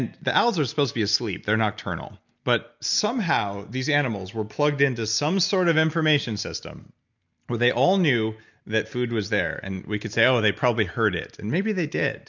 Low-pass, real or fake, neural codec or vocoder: 7.2 kHz; real; none